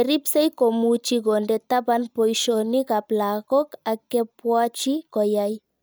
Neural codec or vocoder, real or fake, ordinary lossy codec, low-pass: none; real; none; none